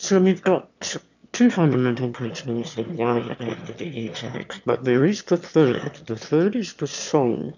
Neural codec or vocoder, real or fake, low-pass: autoencoder, 22.05 kHz, a latent of 192 numbers a frame, VITS, trained on one speaker; fake; 7.2 kHz